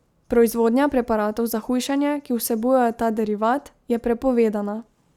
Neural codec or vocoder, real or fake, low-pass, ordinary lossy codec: none; real; 19.8 kHz; Opus, 64 kbps